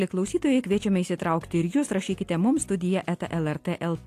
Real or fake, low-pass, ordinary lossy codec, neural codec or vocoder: real; 14.4 kHz; AAC, 64 kbps; none